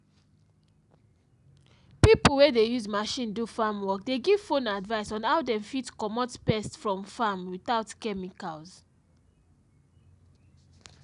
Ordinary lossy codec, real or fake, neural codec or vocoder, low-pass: none; real; none; 10.8 kHz